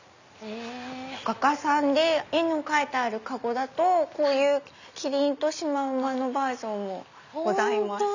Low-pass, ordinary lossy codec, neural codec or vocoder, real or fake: 7.2 kHz; none; none; real